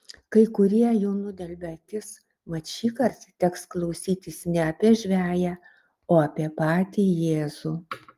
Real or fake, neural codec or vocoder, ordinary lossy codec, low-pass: real; none; Opus, 32 kbps; 14.4 kHz